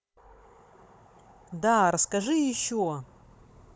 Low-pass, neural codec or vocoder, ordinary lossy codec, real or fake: none; codec, 16 kHz, 16 kbps, FunCodec, trained on Chinese and English, 50 frames a second; none; fake